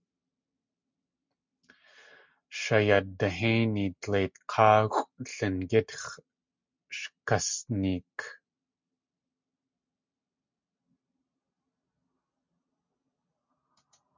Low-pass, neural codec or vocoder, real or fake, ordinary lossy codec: 7.2 kHz; none; real; MP3, 64 kbps